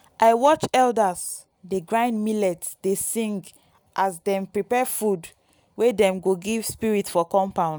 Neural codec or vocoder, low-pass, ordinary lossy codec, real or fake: none; none; none; real